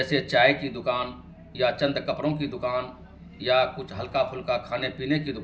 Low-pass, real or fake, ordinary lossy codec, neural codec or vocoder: none; real; none; none